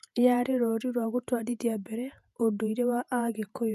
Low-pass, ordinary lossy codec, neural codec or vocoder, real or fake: 14.4 kHz; none; vocoder, 48 kHz, 128 mel bands, Vocos; fake